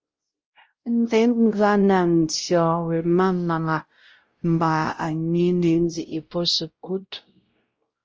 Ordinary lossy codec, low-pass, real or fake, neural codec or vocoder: Opus, 24 kbps; 7.2 kHz; fake; codec, 16 kHz, 0.5 kbps, X-Codec, WavLM features, trained on Multilingual LibriSpeech